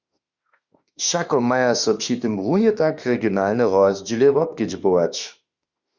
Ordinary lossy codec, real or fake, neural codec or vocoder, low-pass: Opus, 64 kbps; fake; autoencoder, 48 kHz, 32 numbers a frame, DAC-VAE, trained on Japanese speech; 7.2 kHz